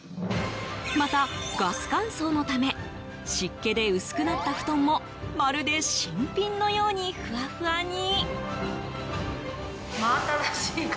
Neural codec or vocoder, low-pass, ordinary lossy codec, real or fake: none; none; none; real